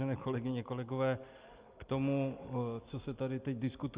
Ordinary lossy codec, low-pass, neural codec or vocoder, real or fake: Opus, 32 kbps; 3.6 kHz; none; real